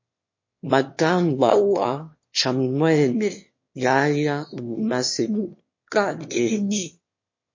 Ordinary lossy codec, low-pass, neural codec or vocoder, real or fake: MP3, 32 kbps; 7.2 kHz; autoencoder, 22.05 kHz, a latent of 192 numbers a frame, VITS, trained on one speaker; fake